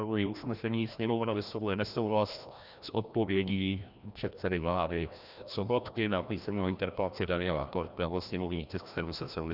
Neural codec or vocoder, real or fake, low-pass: codec, 16 kHz, 1 kbps, FreqCodec, larger model; fake; 5.4 kHz